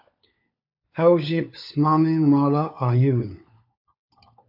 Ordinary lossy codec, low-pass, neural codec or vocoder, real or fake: AAC, 48 kbps; 5.4 kHz; codec, 16 kHz, 4 kbps, FunCodec, trained on LibriTTS, 50 frames a second; fake